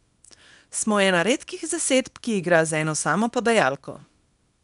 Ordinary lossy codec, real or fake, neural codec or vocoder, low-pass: none; fake; codec, 24 kHz, 0.9 kbps, WavTokenizer, small release; 10.8 kHz